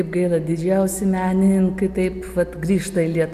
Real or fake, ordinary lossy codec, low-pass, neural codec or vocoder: real; AAC, 96 kbps; 14.4 kHz; none